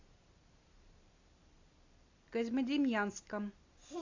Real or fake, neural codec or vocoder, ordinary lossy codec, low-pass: real; none; AAC, 48 kbps; 7.2 kHz